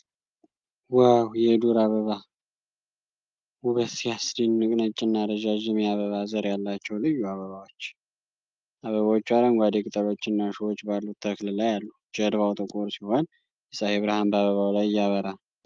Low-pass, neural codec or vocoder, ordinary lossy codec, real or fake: 7.2 kHz; none; Opus, 32 kbps; real